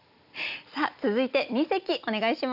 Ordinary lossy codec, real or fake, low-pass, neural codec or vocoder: none; real; 5.4 kHz; none